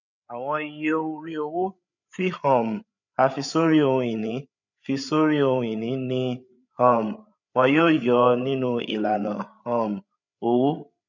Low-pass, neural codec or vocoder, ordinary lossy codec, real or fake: 7.2 kHz; codec, 16 kHz, 8 kbps, FreqCodec, larger model; none; fake